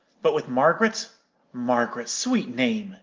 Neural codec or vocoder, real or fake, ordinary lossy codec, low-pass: none; real; Opus, 24 kbps; 7.2 kHz